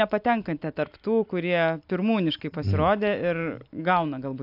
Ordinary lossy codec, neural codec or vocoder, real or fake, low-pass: AAC, 48 kbps; none; real; 5.4 kHz